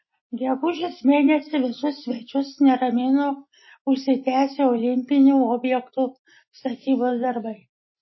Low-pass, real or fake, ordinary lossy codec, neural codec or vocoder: 7.2 kHz; real; MP3, 24 kbps; none